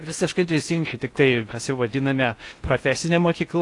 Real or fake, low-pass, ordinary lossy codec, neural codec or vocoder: fake; 10.8 kHz; AAC, 48 kbps; codec, 16 kHz in and 24 kHz out, 0.6 kbps, FocalCodec, streaming, 4096 codes